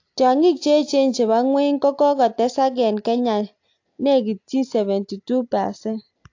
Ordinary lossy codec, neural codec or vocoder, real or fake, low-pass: AAC, 48 kbps; none; real; 7.2 kHz